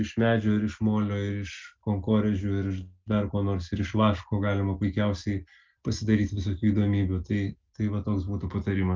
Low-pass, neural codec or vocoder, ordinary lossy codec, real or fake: 7.2 kHz; none; Opus, 24 kbps; real